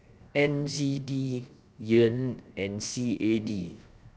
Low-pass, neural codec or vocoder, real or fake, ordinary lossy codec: none; codec, 16 kHz, 0.7 kbps, FocalCodec; fake; none